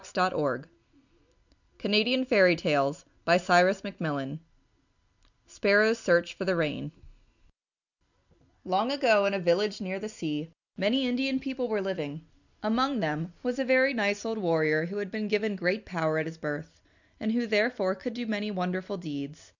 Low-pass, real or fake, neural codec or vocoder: 7.2 kHz; real; none